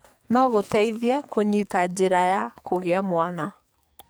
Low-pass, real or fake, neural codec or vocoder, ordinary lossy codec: none; fake; codec, 44.1 kHz, 2.6 kbps, SNAC; none